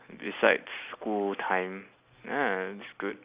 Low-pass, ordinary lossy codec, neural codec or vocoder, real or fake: 3.6 kHz; Opus, 64 kbps; none; real